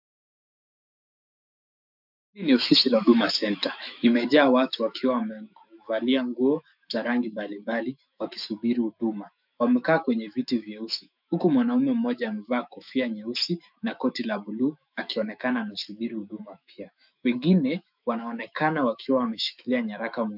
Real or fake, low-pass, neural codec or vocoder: fake; 5.4 kHz; autoencoder, 48 kHz, 128 numbers a frame, DAC-VAE, trained on Japanese speech